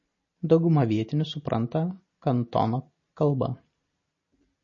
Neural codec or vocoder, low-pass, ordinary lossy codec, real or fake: none; 7.2 kHz; MP3, 32 kbps; real